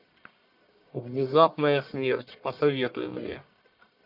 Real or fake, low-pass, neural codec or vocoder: fake; 5.4 kHz; codec, 44.1 kHz, 1.7 kbps, Pupu-Codec